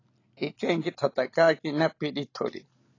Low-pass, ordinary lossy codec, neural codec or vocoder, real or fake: 7.2 kHz; AAC, 32 kbps; none; real